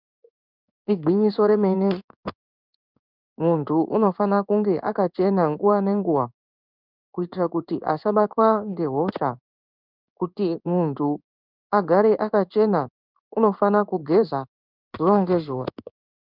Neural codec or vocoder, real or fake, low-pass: codec, 16 kHz in and 24 kHz out, 1 kbps, XY-Tokenizer; fake; 5.4 kHz